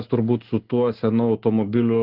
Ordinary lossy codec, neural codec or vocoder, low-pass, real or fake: Opus, 16 kbps; none; 5.4 kHz; real